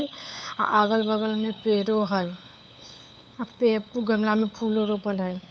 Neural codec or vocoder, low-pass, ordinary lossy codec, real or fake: codec, 16 kHz, 8 kbps, FunCodec, trained on LibriTTS, 25 frames a second; none; none; fake